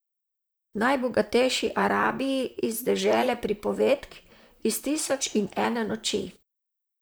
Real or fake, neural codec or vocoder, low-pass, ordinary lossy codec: fake; vocoder, 44.1 kHz, 128 mel bands, Pupu-Vocoder; none; none